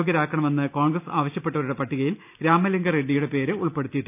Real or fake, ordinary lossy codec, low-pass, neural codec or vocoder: real; none; 3.6 kHz; none